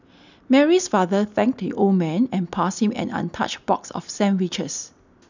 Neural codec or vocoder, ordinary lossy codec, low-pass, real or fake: none; none; 7.2 kHz; real